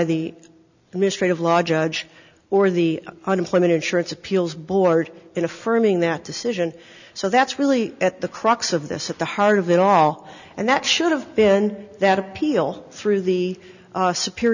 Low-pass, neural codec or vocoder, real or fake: 7.2 kHz; none; real